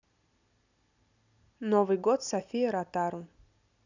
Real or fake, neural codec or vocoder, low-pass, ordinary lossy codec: real; none; 7.2 kHz; none